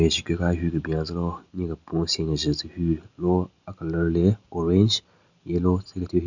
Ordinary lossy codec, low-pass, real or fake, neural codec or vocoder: none; 7.2 kHz; real; none